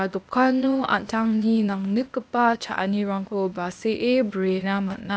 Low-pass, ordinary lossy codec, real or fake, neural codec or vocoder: none; none; fake; codec, 16 kHz, 0.8 kbps, ZipCodec